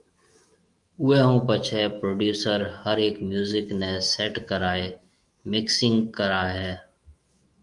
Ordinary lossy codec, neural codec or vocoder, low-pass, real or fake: Opus, 32 kbps; autoencoder, 48 kHz, 128 numbers a frame, DAC-VAE, trained on Japanese speech; 10.8 kHz; fake